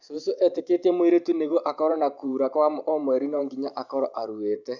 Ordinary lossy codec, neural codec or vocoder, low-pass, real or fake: none; vocoder, 44.1 kHz, 128 mel bands every 512 samples, BigVGAN v2; 7.2 kHz; fake